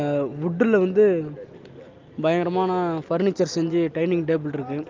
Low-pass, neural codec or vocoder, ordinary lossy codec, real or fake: 7.2 kHz; none; Opus, 24 kbps; real